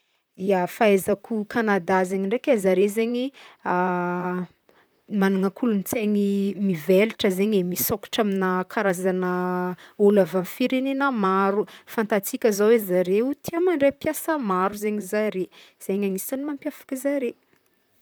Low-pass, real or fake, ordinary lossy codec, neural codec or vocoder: none; fake; none; vocoder, 44.1 kHz, 128 mel bands, Pupu-Vocoder